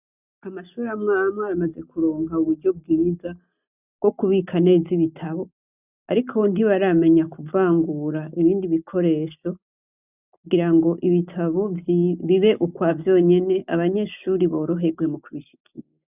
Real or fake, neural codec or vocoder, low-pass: real; none; 3.6 kHz